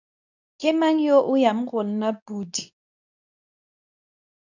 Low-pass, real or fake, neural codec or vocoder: 7.2 kHz; fake; codec, 24 kHz, 0.9 kbps, WavTokenizer, medium speech release version 2